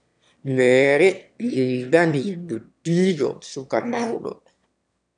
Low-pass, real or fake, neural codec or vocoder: 9.9 kHz; fake; autoencoder, 22.05 kHz, a latent of 192 numbers a frame, VITS, trained on one speaker